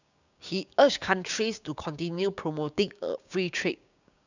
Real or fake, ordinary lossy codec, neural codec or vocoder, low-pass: fake; none; codec, 16 kHz, 6 kbps, DAC; 7.2 kHz